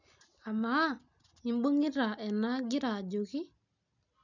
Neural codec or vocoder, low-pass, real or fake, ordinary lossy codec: none; 7.2 kHz; real; none